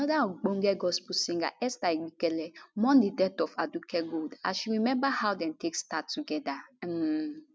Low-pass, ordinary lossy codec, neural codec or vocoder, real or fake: none; none; none; real